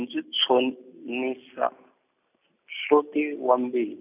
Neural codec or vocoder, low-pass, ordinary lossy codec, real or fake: none; 3.6 kHz; none; real